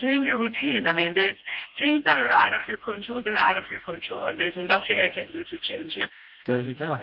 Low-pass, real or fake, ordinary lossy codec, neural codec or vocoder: 5.4 kHz; fake; MP3, 48 kbps; codec, 16 kHz, 1 kbps, FreqCodec, smaller model